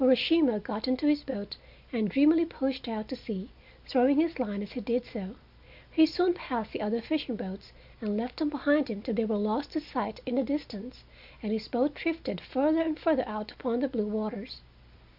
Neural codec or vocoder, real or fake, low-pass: none; real; 5.4 kHz